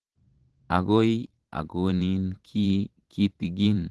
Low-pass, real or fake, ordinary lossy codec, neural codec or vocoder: 10.8 kHz; fake; Opus, 16 kbps; vocoder, 44.1 kHz, 128 mel bands every 512 samples, BigVGAN v2